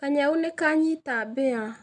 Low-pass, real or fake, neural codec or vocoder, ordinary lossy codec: 9.9 kHz; real; none; none